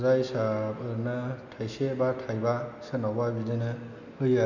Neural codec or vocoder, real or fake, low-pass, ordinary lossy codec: none; real; 7.2 kHz; none